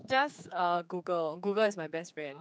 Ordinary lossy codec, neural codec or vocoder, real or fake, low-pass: none; codec, 16 kHz, 4 kbps, X-Codec, HuBERT features, trained on general audio; fake; none